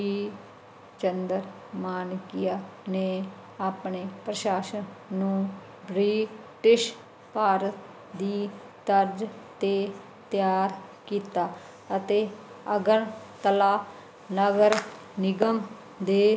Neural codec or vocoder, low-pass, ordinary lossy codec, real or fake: none; none; none; real